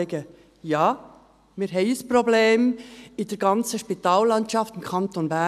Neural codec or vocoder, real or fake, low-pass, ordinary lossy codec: none; real; 14.4 kHz; none